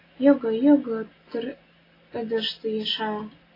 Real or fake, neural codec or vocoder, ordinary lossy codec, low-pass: real; none; AAC, 24 kbps; 5.4 kHz